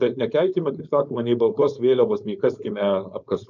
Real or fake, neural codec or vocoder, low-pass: fake; codec, 16 kHz, 4.8 kbps, FACodec; 7.2 kHz